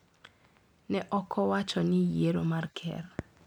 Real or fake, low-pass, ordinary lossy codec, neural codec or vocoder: real; 19.8 kHz; MP3, 96 kbps; none